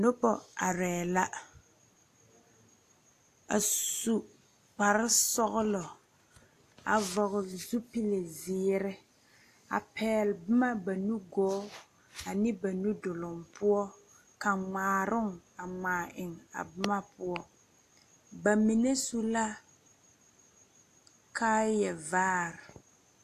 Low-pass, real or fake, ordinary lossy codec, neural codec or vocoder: 14.4 kHz; real; AAC, 64 kbps; none